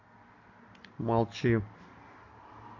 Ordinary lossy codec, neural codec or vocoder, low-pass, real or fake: MP3, 64 kbps; none; 7.2 kHz; real